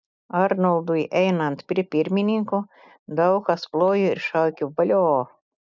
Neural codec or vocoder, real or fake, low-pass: none; real; 7.2 kHz